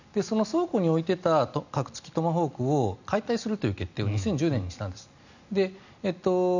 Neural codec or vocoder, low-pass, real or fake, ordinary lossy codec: none; 7.2 kHz; real; none